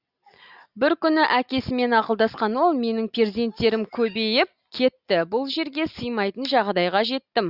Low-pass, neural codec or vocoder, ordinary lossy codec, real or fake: 5.4 kHz; none; Opus, 64 kbps; real